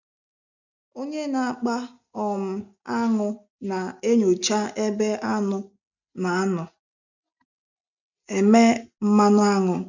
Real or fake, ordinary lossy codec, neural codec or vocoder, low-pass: real; none; none; 7.2 kHz